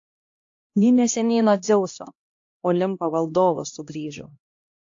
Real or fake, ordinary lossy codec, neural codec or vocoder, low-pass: fake; AAC, 48 kbps; codec, 16 kHz, 1 kbps, X-Codec, HuBERT features, trained on LibriSpeech; 7.2 kHz